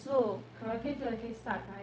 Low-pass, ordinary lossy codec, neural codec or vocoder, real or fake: none; none; codec, 16 kHz, 0.4 kbps, LongCat-Audio-Codec; fake